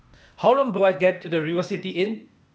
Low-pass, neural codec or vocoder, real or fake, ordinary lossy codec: none; codec, 16 kHz, 0.8 kbps, ZipCodec; fake; none